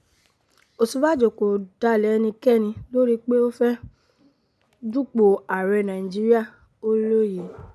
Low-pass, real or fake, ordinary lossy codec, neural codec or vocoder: none; real; none; none